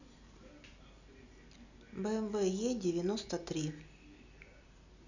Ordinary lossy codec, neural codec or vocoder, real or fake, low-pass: none; none; real; 7.2 kHz